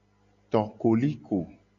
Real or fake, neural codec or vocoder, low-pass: real; none; 7.2 kHz